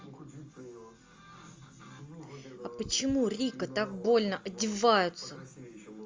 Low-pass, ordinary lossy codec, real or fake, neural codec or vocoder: 7.2 kHz; Opus, 32 kbps; real; none